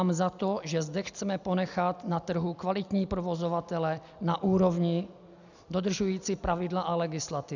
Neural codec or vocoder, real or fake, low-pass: none; real; 7.2 kHz